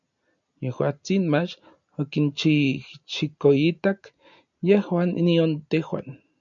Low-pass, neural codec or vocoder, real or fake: 7.2 kHz; none; real